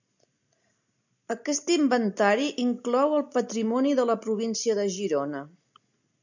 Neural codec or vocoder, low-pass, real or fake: none; 7.2 kHz; real